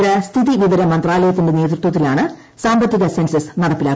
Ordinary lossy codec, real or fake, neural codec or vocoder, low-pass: none; real; none; none